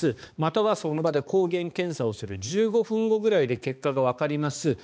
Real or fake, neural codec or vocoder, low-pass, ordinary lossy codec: fake; codec, 16 kHz, 2 kbps, X-Codec, HuBERT features, trained on balanced general audio; none; none